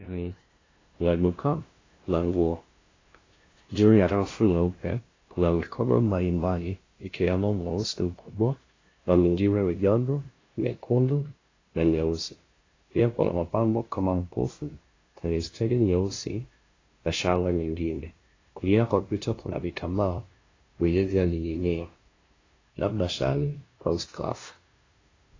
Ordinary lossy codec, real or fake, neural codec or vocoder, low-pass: AAC, 32 kbps; fake; codec, 16 kHz, 0.5 kbps, FunCodec, trained on LibriTTS, 25 frames a second; 7.2 kHz